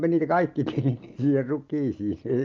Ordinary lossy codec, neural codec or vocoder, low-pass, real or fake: Opus, 24 kbps; none; 7.2 kHz; real